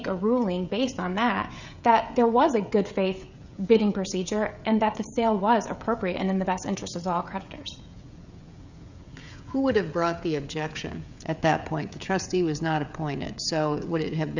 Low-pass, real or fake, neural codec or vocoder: 7.2 kHz; fake; codec, 16 kHz, 16 kbps, FreqCodec, smaller model